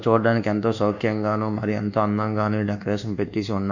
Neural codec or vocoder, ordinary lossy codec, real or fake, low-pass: codec, 24 kHz, 1.2 kbps, DualCodec; none; fake; 7.2 kHz